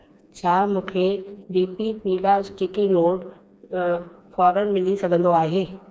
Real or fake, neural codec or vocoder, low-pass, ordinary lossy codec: fake; codec, 16 kHz, 2 kbps, FreqCodec, smaller model; none; none